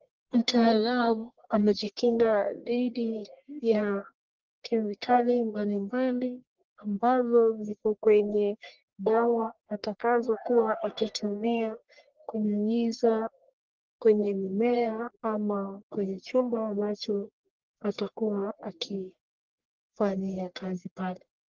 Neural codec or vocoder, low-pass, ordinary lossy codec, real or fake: codec, 44.1 kHz, 1.7 kbps, Pupu-Codec; 7.2 kHz; Opus, 16 kbps; fake